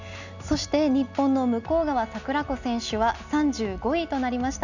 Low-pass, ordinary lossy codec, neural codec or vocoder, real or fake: 7.2 kHz; none; none; real